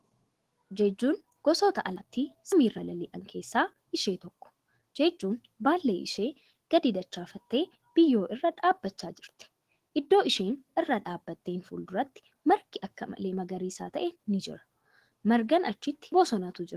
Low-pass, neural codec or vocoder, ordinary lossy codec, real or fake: 14.4 kHz; autoencoder, 48 kHz, 128 numbers a frame, DAC-VAE, trained on Japanese speech; Opus, 16 kbps; fake